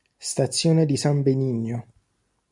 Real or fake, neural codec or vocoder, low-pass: real; none; 10.8 kHz